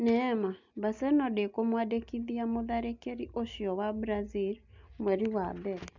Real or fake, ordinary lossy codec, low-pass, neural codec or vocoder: real; MP3, 64 kbps; 7.2 kHz; none